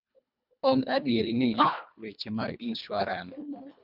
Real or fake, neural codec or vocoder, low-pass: fake; codec, 24 kHz, 1.5 kbps, HILCodec; 5.4 kHz